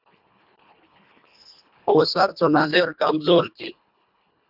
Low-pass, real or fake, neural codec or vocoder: 5.4 kHz; fake; codec, 24 kHz, 1.5 kbps, HILCodec